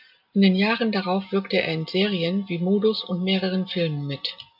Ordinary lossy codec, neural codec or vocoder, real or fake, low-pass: AAC, 48 kbps; none; real; 5.4 kHz